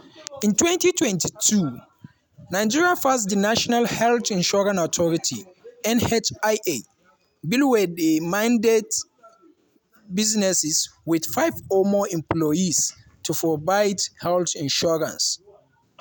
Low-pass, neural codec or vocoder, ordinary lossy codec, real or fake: none; none; none; real